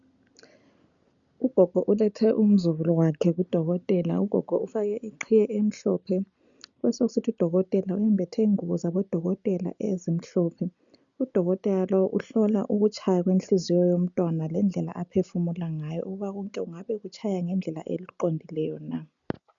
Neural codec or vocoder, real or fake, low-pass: none; real; 7.2 kHz